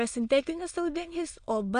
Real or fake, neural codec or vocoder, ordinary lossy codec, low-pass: fake; autoencoder, 22.05 kHz, a latent of 192 numbers a frame, VITS, trained on many speakers; Opus, 64 kbps; 9.9 kHz